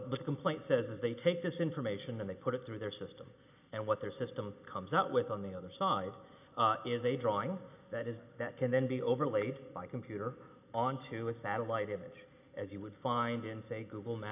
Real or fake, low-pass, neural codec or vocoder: real; 3.6 kHz; none